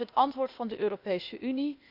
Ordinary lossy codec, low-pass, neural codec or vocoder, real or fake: none; 5.4 kHz; codec, 16 kHz, 0.8 kbps, ZipCodec; fake